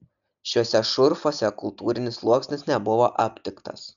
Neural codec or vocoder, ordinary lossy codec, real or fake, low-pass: none; MP3, 96 kbps; real; 7.2 kHz